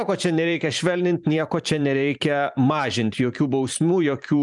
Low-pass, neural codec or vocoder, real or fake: 10.8 kHz; vocoder, 44.1 kHz, 128 mel bands every 512 samples, BigVGAN v2; fake